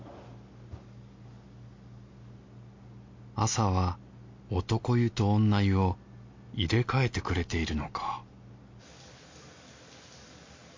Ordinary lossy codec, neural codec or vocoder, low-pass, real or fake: MP3, 48 kbps; none; 7.2 kHz; real